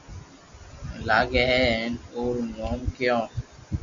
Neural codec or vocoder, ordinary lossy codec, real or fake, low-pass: none; AAC, 64 kbps; real; 7.2 kHz